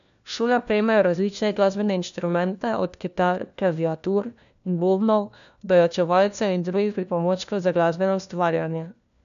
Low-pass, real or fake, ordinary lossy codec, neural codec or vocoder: 7.2 kHz; fake; none; codec, 16 kHz, 1 kbps, FunCodec, trained on LibriTTS, 50 frames a second